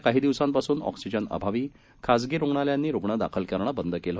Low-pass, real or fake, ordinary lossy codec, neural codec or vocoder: none; real; none; none